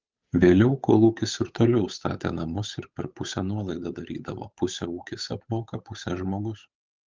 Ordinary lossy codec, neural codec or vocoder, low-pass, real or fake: Opus, 24 kbps; codec, 16 kHz, 8 kbps, FunCodec, trained on Chinese and English, 25 frames a second; 7.2 kHz; fake